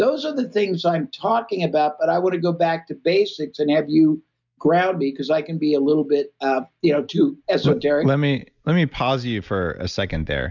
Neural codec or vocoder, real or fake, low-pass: none; real; 7.2 kHz